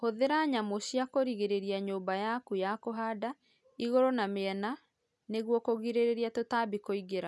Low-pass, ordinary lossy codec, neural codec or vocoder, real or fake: none; none; none; real